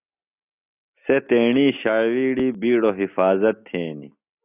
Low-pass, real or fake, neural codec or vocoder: 3.6 kHz; real; none